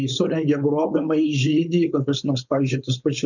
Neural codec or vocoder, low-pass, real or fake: codec, 16 kHz, 4.8 kbps, FACodec; 7.2 kHz; fake